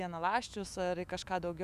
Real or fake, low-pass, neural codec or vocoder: fake; 14.4 kHz; autoencoder, 48 kHz, 128 numbers a frame, DAC-VAE, trained on Japanese speech